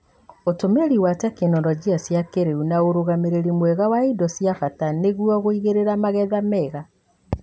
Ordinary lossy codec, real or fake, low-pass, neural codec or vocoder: none; real; none; none